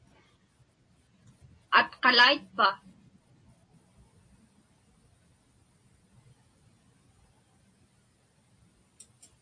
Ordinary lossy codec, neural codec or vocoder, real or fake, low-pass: AAC, 64 kbps; none; real; 9.9 kHz